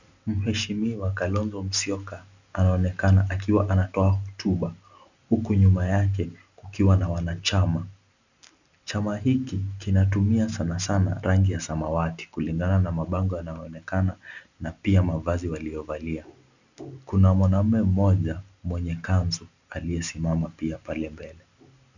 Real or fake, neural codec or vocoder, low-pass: real; none; 7.2 kHz